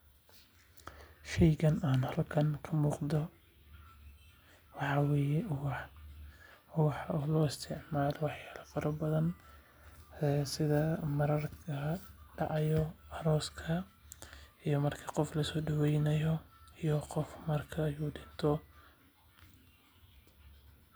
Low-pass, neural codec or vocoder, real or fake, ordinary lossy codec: none; none; real; none